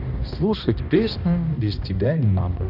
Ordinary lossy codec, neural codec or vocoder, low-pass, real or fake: none; codec, 16 kHz, 1 kbps, X-Codec, HuBERT features, trained on balanced general audio; 5.4 kHz; fake